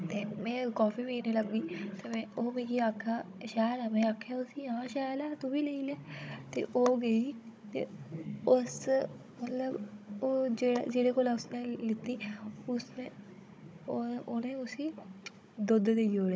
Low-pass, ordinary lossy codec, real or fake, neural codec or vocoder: none; none; fake; codec, 16 kHz, 16 kbps, FunCodec, trained on Chinese and English, 50 frames a second